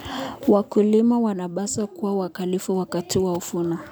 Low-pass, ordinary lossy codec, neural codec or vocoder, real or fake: none; none; none; real